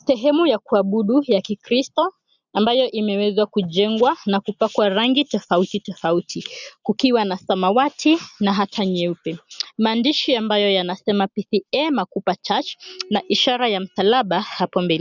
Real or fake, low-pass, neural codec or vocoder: real; 7.2 kHz; none